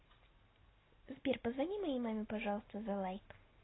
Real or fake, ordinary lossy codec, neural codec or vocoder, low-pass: real; AAC, 16 kbps; none; 7.2 kHz